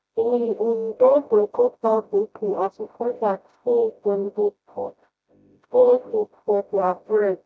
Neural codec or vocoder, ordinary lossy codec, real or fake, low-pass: codec, 16 kHz, 0.5 kbps, FreqCodec, smaller model; none; fake; none